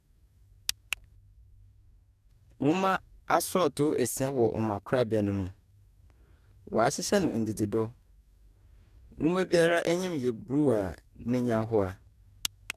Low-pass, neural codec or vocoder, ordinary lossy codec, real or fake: 14.4 kHz; codec, 44.1 kHz, 2.6 kbps, DAC; none; fake